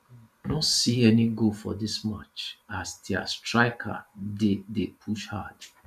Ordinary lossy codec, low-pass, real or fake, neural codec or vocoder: none; 14.4 kHz; fake; vocoder, 44.1 kHz, 128 mel bands every 512 samples, BigVGAN v2